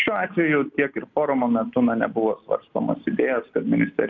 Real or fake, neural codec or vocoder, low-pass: real; none; 7.2 kHz